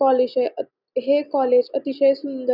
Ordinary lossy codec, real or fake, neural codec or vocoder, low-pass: none; real; none; 5.4 kHz